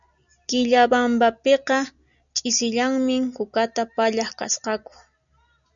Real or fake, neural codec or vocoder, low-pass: real; none; 7.2 kHz